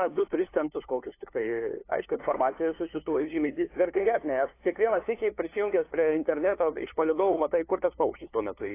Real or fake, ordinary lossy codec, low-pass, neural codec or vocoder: fake; AAC, 24 kbps; 3.6 kHz; codec, 16 kHz, 2 kbps, FunCodec, trained on LibriTTS, 25 frames a second